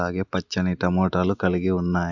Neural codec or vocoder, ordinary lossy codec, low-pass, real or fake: none; none; 7.2 kHz; real